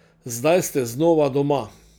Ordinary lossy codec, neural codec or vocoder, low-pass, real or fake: none; none; none; real